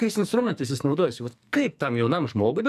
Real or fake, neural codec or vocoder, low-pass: fake; codec, 44.1 kHz, 2.6 kbps, SNAC; 14.4 kHz